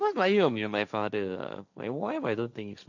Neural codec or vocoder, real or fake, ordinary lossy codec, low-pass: codec, 16 kHz, 1.1 kbps, Voila-Tokenizer; fake; none; 7.2 kHz